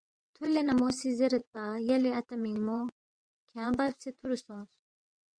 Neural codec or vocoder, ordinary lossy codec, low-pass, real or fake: vocoder, 22.05 kHz, 80 mel bands, WaveNeXt; Opus, 64 kbps; 9.9 kHz; fake